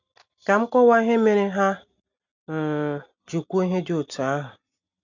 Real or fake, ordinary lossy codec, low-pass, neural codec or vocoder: real; none; 7.2 kHz; none